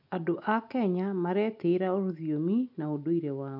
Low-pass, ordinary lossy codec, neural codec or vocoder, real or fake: 5.4 kHz; none; none; real